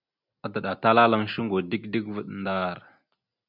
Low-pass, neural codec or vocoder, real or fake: 5.4 kHz; none; real